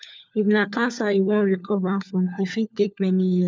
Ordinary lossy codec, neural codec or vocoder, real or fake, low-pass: none; codec, 16 kHz, 4 kbps, FunCodec, trained on LibriTTS, 50 frames a second; fake; none